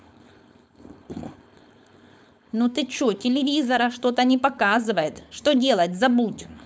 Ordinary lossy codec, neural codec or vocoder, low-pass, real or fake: none; codec, 16 kHz, 4.8 kbps, FACodec; none; fake